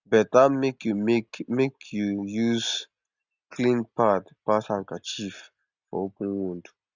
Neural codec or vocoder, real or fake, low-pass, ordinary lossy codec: none; real; 7.2 kHz; Opus, 64 kbps